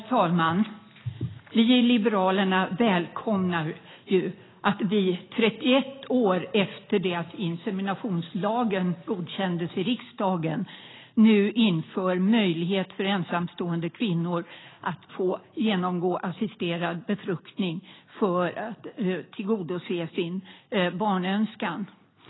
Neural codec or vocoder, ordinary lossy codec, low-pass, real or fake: none; AAC, 16 kbps; 7.2 kHz; real